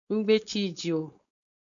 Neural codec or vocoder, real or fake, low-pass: codec, 16 kHz, 4.8 kbps, FACodec; fake; 7.2 kHz